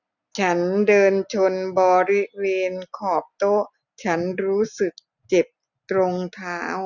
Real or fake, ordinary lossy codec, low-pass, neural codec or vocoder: real; none; 7.2 kHz; none